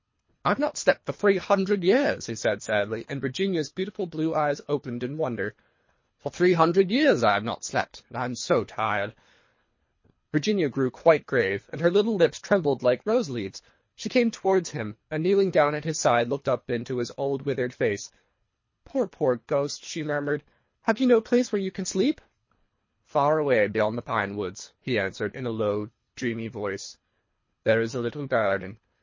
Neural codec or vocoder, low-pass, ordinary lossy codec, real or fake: codec, 24 kHz, 3 kbps, HILCodec; 7.2 kHz; MP3, 32 kbps; fake